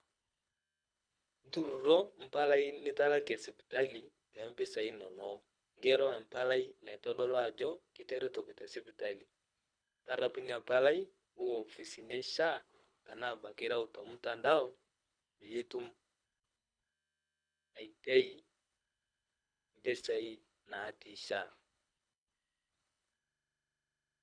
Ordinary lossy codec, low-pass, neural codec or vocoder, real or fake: none; 9.9 kHz; codec, 24 kHz, 3 kbps, HILCodec; fake